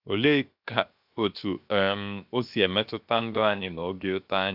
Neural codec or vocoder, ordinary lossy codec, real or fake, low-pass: codec, 16 kHz, 0.7 kbps, FocalCodec; AAC, 48 kbps; fake; 5.4 kHz